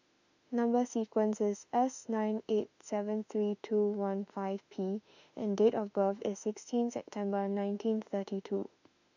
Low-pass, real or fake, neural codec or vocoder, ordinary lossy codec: 7.2 kHz; fake; autoencoder, 48 kHz, 32 numbers a frame, DAC-VAE, trained on Japanese speech; none